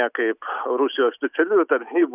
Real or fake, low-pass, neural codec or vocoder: real; 3.6 kHz; none